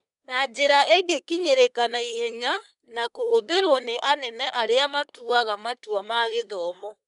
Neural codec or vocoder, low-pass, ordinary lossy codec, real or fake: codec, 24 kHz, 1 kbps, SNAC; 10.8 kHz; none; fake